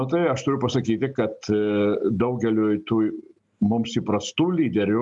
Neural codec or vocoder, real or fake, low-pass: none; real; 10.8 kHz